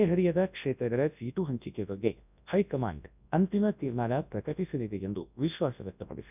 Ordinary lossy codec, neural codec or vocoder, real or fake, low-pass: none; codec, 24 kHz, 0.9 kbps, WavTokenizer, large speech release; fake; 3.6 kHz